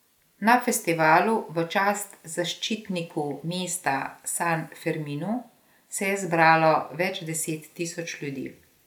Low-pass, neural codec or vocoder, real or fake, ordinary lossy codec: 19.8 kHz; none; real; none